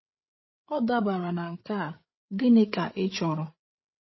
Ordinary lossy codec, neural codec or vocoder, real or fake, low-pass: MP3, 24 kbps; codec, 16 kHz, 16 kbps, FreqCodec, larger model; fake; 7.2 kHz